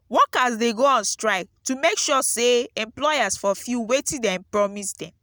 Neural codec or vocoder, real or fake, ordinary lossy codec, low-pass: none; real; none; none